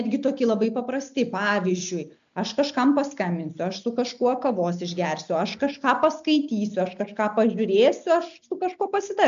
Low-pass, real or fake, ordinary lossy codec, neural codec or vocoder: 7.2 kHz; real; MP3, 64 kbps; none